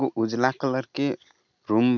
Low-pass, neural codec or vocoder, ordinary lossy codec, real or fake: 7.2 kHz; none; none; real